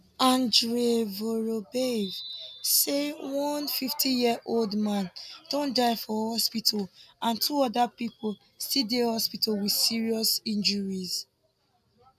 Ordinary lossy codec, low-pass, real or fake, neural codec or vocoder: none; 14.4 kHz; real; none